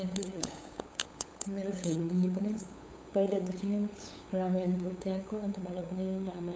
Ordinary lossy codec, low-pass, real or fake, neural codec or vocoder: none; none; fake; codec, 16 kHz, 8 kbps, FunCodec, trained on LibriTTS, 25 frames a second